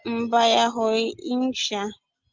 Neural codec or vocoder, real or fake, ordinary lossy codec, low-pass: none; real; Opus, 32 kbps; 7.2 kHz